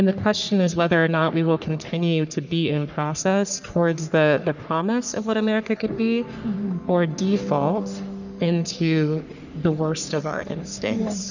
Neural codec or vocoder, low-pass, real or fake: codec, 44.1 kHz, 3.4 kbps, Pupu-Codec; 7.2 kHz; fake